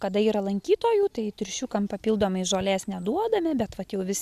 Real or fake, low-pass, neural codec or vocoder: real; 14.4 kHz; none